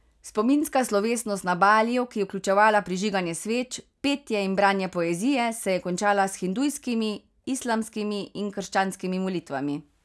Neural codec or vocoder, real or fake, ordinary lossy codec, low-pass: none; real; none; none